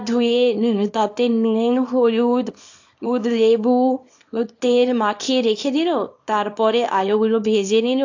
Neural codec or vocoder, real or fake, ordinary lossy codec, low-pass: codec, 24 kHz, 0.9 kbps, WavTokenizer, small release; fake; AAC, 48 kbps; 7.2 kHz